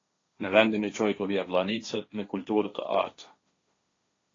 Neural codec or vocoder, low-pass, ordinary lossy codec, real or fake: codec, 16 kHz, 1.1 kbps, Voila-Tokenizer; 7.2 kHz; AAC, 32 kbps; fake